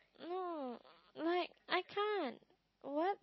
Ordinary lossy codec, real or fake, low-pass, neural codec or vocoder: MP3, 24 kbps; real; 7.2 kHz; none